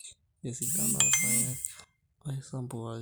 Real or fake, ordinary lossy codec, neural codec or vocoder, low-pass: real; none; none; none